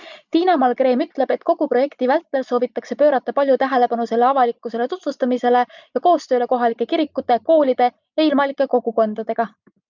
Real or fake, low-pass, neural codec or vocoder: real; 7.2 kHz; none